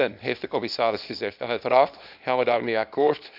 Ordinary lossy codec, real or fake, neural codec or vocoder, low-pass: AAC, 48 kbps; fake; codec, 24 kHz, 0.9 kbps, WavTokenizer, small release; 5.4 kHz